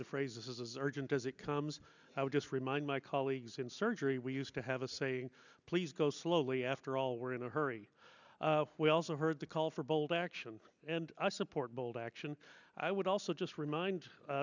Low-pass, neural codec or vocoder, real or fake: 7.2 kHz; none; real